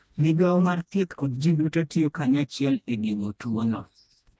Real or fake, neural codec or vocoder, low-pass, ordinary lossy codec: fake; codec, 16 kHz, 1 kbps, FreqCodec, smaller model; none; none